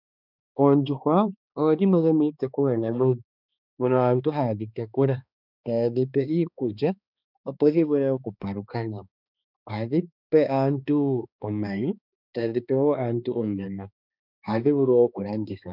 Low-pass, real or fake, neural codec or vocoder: 5.4 kHz; fake; codec, 16 kHz, 2 kbps, X-Codec, HuBERT features, trained on balanced general audio